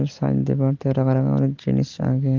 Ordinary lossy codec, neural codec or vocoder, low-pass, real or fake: Opus, 16 kbps; none; 7.2 kHz; real